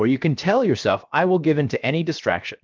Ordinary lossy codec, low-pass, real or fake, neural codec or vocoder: Opus, 24 kbps; 7.2 kHz; fake; codec, 16 kHz, 0.7 kbps, FocalCodec